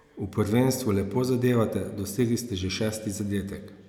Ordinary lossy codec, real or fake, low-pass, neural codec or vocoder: none; real; 19.8 kHz; none